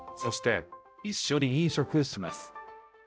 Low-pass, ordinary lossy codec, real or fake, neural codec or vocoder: none; none; fake; codec, 16 kHz, 0.5 kbps, X-Codec, HuBERT features, trained on balanced general audio